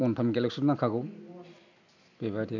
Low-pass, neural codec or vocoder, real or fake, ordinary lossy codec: 7.2 kHz; none; real; none